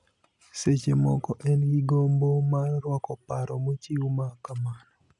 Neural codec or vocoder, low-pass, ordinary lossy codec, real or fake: none; 10.8 kHz; none; real